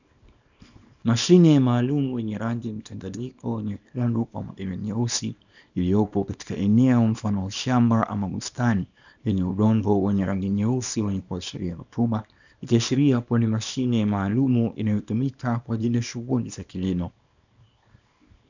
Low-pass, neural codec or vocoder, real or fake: 7.2 kHz; codec, 24 kHz, 0.9 kbps, WavTokenizer, small release; fake